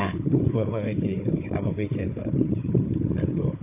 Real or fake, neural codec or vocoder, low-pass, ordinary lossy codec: fake; codec, 16 kHz, 4 kbps, FunCodec, trained on Chinese and English, 50 frames a second; 3.6 kHz; AAC, 24 kbps